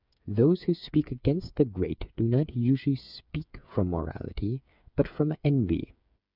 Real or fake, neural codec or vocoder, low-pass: fake; codec, 16 kHz, 8 kbps, FreqCodec, smaller model; 5.4 kHz